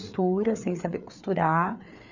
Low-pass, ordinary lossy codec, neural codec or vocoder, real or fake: 7.2 kHz; none; codec, 16 kHz, 4 kbps, FreqCodec, larger model; fake